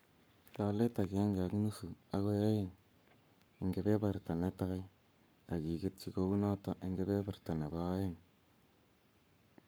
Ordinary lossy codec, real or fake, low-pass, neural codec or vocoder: none; fake; none; codec, 44.1 kHz, 7.8 kbps, Pupu-Codec